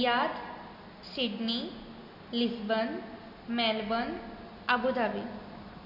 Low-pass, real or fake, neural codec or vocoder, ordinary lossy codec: 5.4 kHz; real; none; MP3, 32 kbps